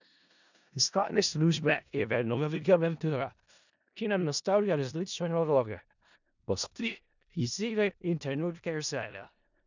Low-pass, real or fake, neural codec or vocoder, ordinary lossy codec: 7.2 kHz; fake; codec, 16 kHz in and 24 kHz out, 0.4 kbps, LongCat-Audio-Codec, four codebook decoder; none